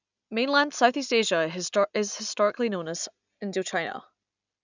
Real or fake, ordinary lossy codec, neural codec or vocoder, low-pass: real; none; none; 7.2 kHz